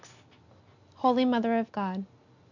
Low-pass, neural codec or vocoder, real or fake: 7.2 kHz; none; real